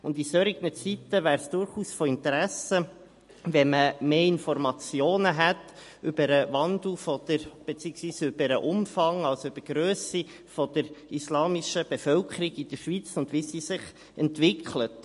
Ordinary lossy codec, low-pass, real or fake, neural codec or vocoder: MP3, 48 kbps; 14.4 kHz; real; none